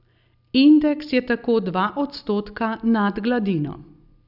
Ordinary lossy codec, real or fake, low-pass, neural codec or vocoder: none; real; 5.4 kHz; none